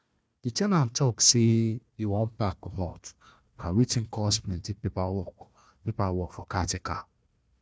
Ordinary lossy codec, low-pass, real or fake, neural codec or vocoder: none; none; fake; codec, 16 kHz, 1 kbps, FunCodec, trained on Chinese and English, 50 frames a second